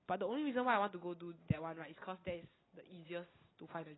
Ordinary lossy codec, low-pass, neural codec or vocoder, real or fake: AAC, 16 kbps; 7.2 kHz; none; real